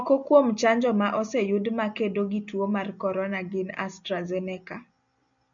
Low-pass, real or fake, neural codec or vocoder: 7.2 kHz; real; none